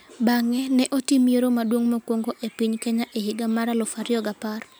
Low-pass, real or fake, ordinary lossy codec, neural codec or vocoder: none; real; none; none